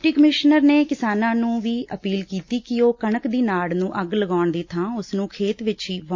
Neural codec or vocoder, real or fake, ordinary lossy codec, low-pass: none; real; MP3, 48 kbps; 7.2 kHz